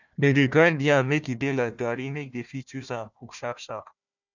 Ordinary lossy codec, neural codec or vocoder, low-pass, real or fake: none; codec, 16 kHz, 1 kbps, FunCodec, trained on Chinese and English, 50 frames a second; 7.2 kHz; fake